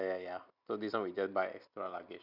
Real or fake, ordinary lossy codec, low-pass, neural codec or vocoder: real; none; 5.4 kHz; none